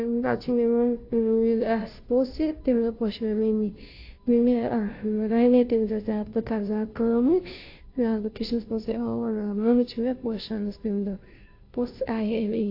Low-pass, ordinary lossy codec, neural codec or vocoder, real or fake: 5.4 kHz; AAC, 32 kbps; codec, 16 kHz, 0.5 kbps, FunCodec, trained on Chinese and English, 25 frames a second; fake